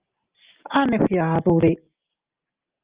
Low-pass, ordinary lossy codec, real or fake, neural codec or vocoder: 3.6 kHz; Opus, 24 kbps; real; none